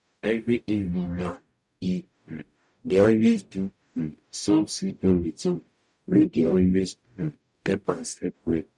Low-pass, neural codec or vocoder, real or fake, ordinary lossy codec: 10.8 kHz; codec, 44.1 kHz, 0.9 kbps, DAC; fake; none